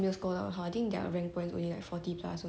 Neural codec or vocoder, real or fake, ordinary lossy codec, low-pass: none; real; none; none